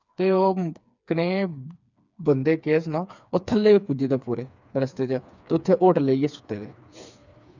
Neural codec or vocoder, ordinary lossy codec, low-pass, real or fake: codec, 16 kHz, 4 kbps, FreqCodec, smaller model; none; 7.2 kHz; fake